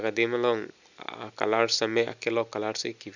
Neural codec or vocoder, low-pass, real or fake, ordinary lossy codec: none; 7.2 kHz; real; none